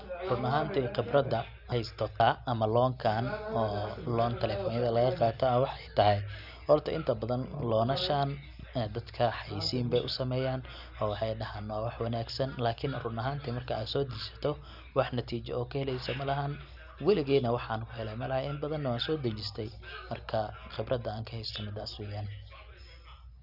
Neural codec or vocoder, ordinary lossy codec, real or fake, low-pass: none; none; real; 5.4 kHz